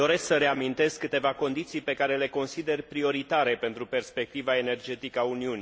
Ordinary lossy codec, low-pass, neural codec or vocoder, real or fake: none; none; none; real